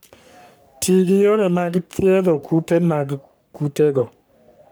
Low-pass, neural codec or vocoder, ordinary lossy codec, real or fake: none; codec, 44.1 kHz, 3.4 kbps, Pupu-Codec; none; fake